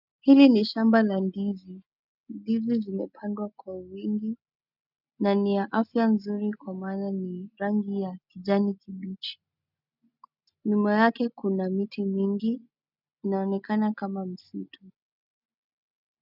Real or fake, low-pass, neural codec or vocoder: real; 5.4 kHz; none